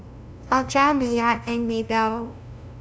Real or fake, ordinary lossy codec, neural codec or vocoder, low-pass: fake; none; codec, 16 kHz, 0.5 kbps, FunCodec, trained on LibriTTS, 25 frames a second; none